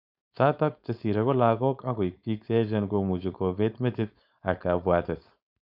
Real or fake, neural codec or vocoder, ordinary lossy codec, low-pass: fake; codec, 16 kHz, 4.8 kbps, FACodec; none; 5.4 kHz